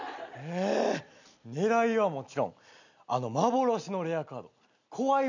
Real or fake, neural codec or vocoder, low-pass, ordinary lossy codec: real; none; 7.2 kHz; none